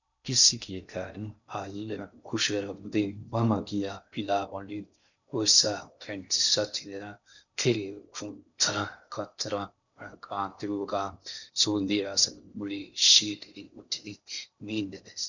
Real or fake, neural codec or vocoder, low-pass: fake; codec, 16 kHz in and 24 kHz out, 0.6 kbps, FocalCodec, streaming, 2048 codes; 7.2 kHz